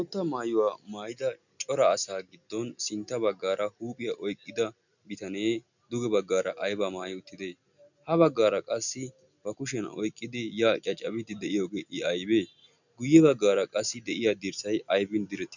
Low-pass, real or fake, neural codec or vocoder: 7.2 kHz; real; none